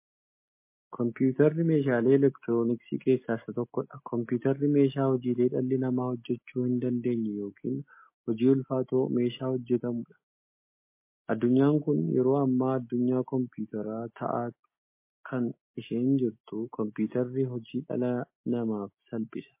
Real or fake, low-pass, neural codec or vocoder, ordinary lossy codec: real; 3.6 kHz; none; MP3, 24 kbps